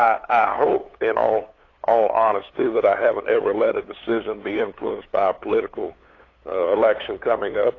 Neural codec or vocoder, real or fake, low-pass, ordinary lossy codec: codec, 16 kHz, 8 kbps, FunCodec, trained on LibriTTS, 25 frames a second; fake; 7.2 kHz; AAC, 32 kbps